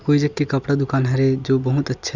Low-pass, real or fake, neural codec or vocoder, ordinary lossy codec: 7.2 kHz; real; none; none